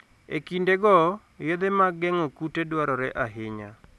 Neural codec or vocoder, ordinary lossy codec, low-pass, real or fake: none; none; none; real